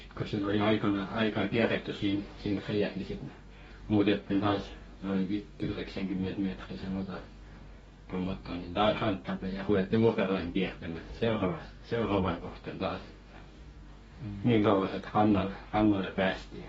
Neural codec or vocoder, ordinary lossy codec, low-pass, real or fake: codec, 44.1 kHz, 2.6 kbps, DAC; AAC, 24 kbps; 19.8 kHz; fake